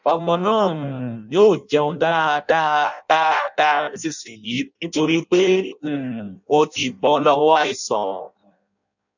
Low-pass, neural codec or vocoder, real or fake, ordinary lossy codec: 7.2 kHz; codec, 16 kHz in and 24 kHz out, 0.6 kbps, FireRedTTS-2 codec; fake; none